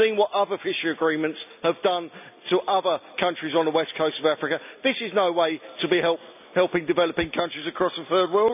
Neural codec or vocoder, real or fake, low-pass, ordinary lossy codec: none; real; 3.6 kHz; none